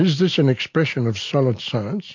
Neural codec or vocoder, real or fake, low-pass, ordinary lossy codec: none; real; 7.2 kHz; MP3, 48 kbps